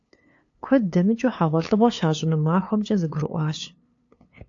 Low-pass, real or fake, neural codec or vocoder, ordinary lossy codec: 7.2 kHz; fake; codec, 16 kHz, 2 kbps, FunCodec, trained on LibriTTS, 25 frames a second; AAC, 48 kbps